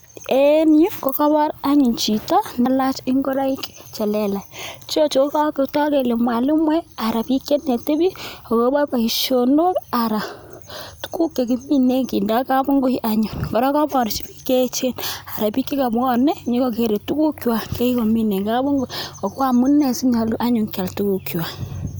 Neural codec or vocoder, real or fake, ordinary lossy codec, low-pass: none; real; none; none